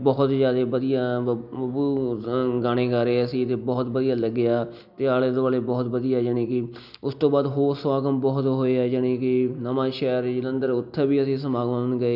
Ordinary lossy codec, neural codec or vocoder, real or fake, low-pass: none; none; real; 5.4 kHz